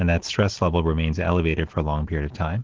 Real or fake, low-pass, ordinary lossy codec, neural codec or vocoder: real; 7.2 kHz; Opus, 16 kbps; none